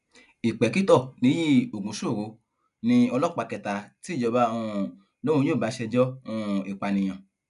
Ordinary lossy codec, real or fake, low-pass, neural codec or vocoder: none; real; 10.8 kHz; none